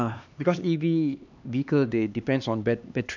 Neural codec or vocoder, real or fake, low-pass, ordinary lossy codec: codec, 16 kHz, 2 kbps, X-Codec, HuBERT features, trained on LibriSpeech; fake; 7.2 kHz; none